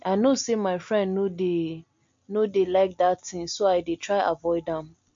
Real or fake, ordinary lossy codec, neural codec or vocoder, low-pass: real; MP3, 48 kbps; none; 7.2 kHz